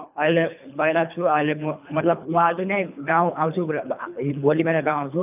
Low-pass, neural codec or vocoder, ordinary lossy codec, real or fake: 3.6 kHz; codec, 24 kHz, 3 kbps, HILCodec; none; fake